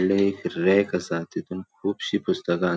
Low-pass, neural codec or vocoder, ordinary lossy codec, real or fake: none; none; none; real